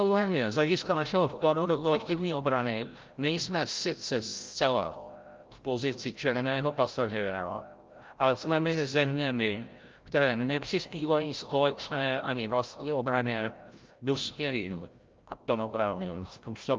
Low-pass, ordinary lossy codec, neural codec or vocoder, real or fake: 7.2 kHz; Opus, 24 kbps; codec, 16 kHz, 0.5 kbps, FreqCodec, larger model; fake